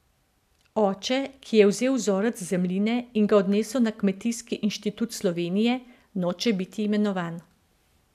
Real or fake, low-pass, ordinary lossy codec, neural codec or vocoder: real; 14.4 kHz; none; none